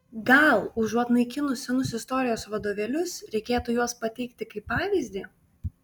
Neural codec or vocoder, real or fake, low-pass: none; real; 19.8 kHz